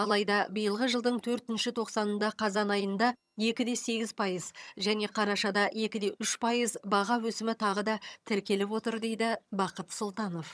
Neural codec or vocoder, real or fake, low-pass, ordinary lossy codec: vocoder, 22.05 kHz, 80 mel bands, HiFi-GAN; fake; none; none